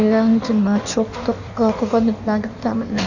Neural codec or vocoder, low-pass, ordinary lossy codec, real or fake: codec, 16 kHz in and 24 kHz out, 1.1 kbps, FireRedTTS-2 codec; 7.2 kHz; none; fake